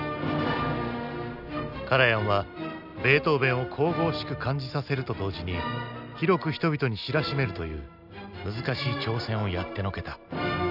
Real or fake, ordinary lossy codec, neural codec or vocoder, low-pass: real; none; none; 5.4 kHz